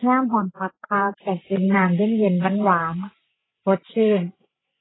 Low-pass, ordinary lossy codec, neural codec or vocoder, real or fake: 7.2 kHz; AAC, 16 kbps; codec, 16 kHz, 8 kbps, FreqCodec, larger model; fake